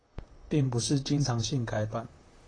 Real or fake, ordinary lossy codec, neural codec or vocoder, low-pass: fake; AAC, 32 kbps; vocoder, 44.1 kHz, 128 mel bands, Pupu-Vocoder; 9.9 kHz